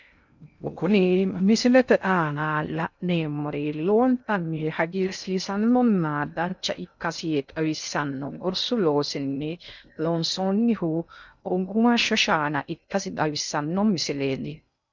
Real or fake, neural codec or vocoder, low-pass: fake; codec, 16 kHz in and 24 kHz out, 0.6 kbps, FocalCodec, streaming, 2048 codes; 7.2 kHz